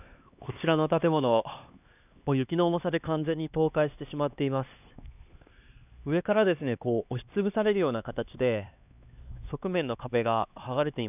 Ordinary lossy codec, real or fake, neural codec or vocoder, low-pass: none; fake; codec, 16 kHz, 2 kbps, X-Codec, HuBERT features, trained on LibriSpeech; 3.6 kHz